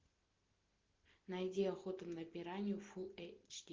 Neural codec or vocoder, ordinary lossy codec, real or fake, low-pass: none; Opus, 16 kbps; real; 7.2 kHz